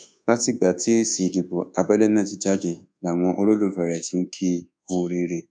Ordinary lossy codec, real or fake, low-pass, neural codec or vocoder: none; fake; 9.9 kHz; codec, 24 kHz, 1.2 kbps, DualCodec